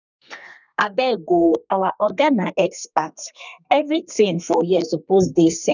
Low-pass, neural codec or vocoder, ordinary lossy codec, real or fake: 7.2 kHz; codec, 32 kHz, 1.9 kbps, SNAC; none; fake